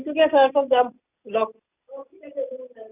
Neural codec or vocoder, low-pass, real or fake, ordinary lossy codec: none; 3.6 kHz; real; none